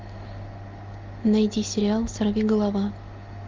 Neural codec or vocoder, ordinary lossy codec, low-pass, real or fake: none; Opus, 32 kbps; 7.2 kHz; real